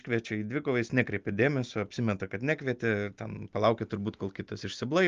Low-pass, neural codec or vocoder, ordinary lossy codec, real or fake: 7.2 kHz; none; Opus, 32 kbps; real